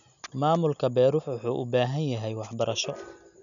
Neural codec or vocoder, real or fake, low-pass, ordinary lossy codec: none; real; 7.2 kHz; none